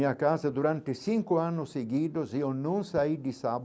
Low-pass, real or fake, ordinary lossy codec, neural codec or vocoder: none; real; none; none